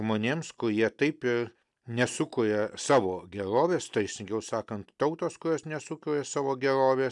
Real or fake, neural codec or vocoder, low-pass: real; none; 10.8 kHz